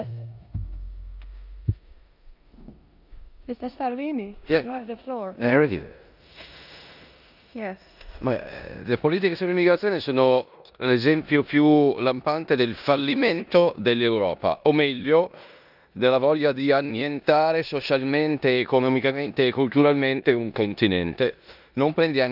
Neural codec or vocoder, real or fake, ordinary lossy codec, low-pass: codec, 16 kHz in and 24 kHz out, 0.9 kbps, LongCat-Audio-Codec, four codebook decoder; fake; none; 5.4 kHz